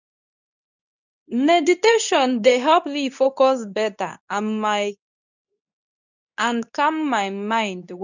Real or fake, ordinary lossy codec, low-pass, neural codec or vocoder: fake; none; 7.2 kHz; codec, 24 kHz, 0.9 kbps, WavTokenizer, medium speech release version 2